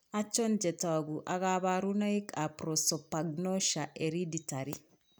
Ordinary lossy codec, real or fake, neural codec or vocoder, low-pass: none; real; none; none